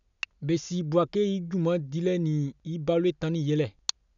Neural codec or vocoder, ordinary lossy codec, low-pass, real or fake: none; none; 7.2 kHz; real